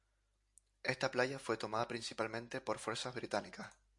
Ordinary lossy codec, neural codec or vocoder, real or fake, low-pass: MP3, 64 kbps; none; real; 10.8 kHz